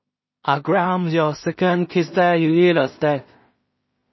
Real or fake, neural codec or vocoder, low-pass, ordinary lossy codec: fake; codec, 16 kHz in and 24 kHz out, 0.4 kbps, LongCat-Audio-Codec, two codebook decoder; 7.2 kHz; MP3, 24 kbps